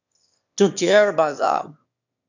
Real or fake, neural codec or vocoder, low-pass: fake; autoencoder, 22.05 kHz, a latent of 192 numbers a frame, VITS, trained on one speaker; 7.2 kHz